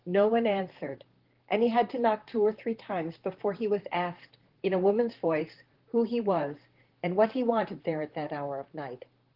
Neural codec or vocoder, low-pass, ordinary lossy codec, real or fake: vocoder, 44.1 kHz, 128 mel bands, Pupu-Vocoder; 5.4 kHz; Opus, 16 kbps; fake